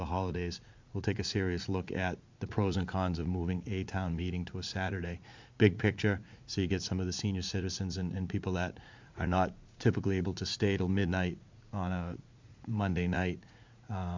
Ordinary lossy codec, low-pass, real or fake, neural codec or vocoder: MP3, 64 kbps; 7.2 kHz; fake; vocoder, 44.1 kHz, 80 mel bands, Vocos